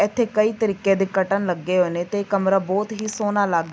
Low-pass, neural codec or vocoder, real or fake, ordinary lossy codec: none; none; real; none